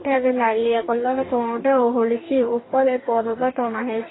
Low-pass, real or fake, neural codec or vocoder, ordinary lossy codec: 7.2 kHz; fake; codec, 44.1 kHz, 2.6 kbps, DAC; AAC, 16 kbps